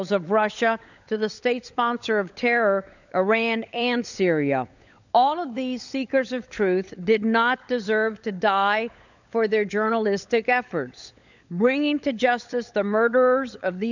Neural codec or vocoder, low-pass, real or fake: codec, 16 kHz, 16 kbps, FunCodec, trained on LibriTTS, 50 frames a second; 7.2 kHz; fake